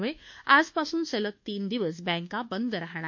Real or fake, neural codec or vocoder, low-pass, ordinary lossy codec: fake; codec, 24 kHz, 1.2 kbps, DualCodec; 7.2 kHz; MP3, 48 kbps